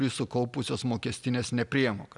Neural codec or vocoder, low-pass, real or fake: none; 10.8 kHz; real